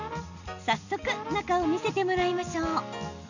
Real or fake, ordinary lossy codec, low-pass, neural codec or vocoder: real; none; 7.2 kHz; none